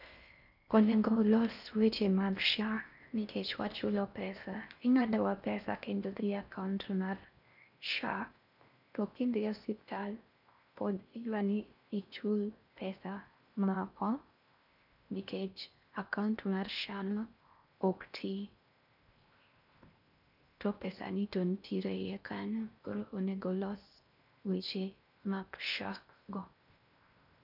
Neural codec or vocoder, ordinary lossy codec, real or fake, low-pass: codec, 16 kHz in and 24 kHz out, 0.6 kbps, FocalCodec, streaming, 4096 codes; none; fake; 5.4 kHz